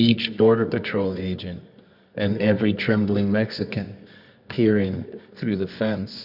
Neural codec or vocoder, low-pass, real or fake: codec, 24 kHz, 0.9 kbps, WavTokenizer, medium music audio release; 5.4 kHz; fake